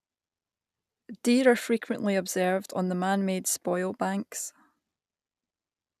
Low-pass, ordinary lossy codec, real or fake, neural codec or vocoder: 14.4 kHz; none; real; none